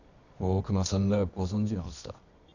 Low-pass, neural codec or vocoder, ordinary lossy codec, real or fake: 7.2 kHz; codec, 24 kHz, 0.9 kbps, WavTokenizer, medium music audio release; none; fake